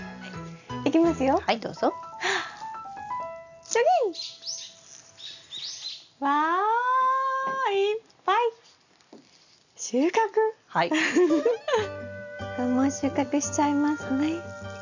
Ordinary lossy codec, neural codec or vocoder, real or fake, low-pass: none; none; real; 7.2 kHz